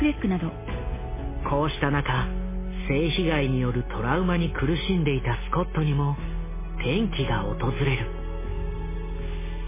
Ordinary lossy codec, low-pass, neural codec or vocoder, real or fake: MP3, 16 kbps; 3.6 kHz; none; real